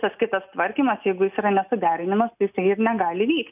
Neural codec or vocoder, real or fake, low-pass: none; real; 3.6 kHz